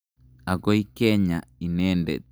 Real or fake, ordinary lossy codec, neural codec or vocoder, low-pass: real; none; none; none